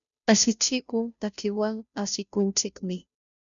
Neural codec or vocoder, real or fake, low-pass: codec, 16 kHz, 0.5 kbps, FunCodec, trained on Chinese and English, 25 frames a second; fake; 7.2 kHz